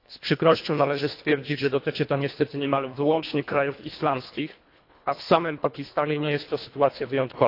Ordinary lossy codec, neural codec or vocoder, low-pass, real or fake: AAC, 32 kbps; codec, 24 kHz, 1.5 kbps, HILCodec; 5.4 kHz; fake